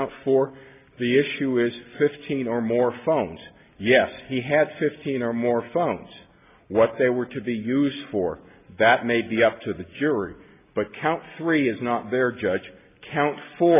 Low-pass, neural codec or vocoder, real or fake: 3.6 kHz; none; real